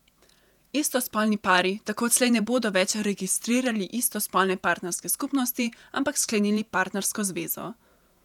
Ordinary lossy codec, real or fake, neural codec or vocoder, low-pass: none; fake; vocoder, 48 kHz, 128 mel bands, Vocos; 19.8 kHz